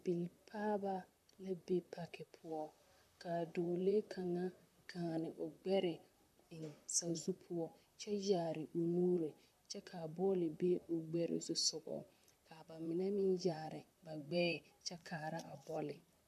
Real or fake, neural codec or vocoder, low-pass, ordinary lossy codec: fake; vocoder, 44.1 kHz, 128 mel bands, Pupu-Vocoder; 14.4 kHz; MP3, 96 kbps